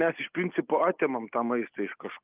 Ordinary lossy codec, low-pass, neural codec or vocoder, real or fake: Opus, 24 kbps; 3.6 kHz; none; real